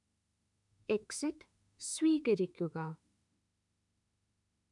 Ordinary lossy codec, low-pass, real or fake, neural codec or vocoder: none; 10.8 kHz; fake; autoencoder, 48 kHz, 32 numbers a frame, DAC-VAE, trained on Japanese speech